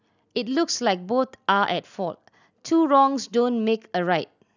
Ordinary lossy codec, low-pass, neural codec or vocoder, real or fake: none; 7.2 kHz; none; real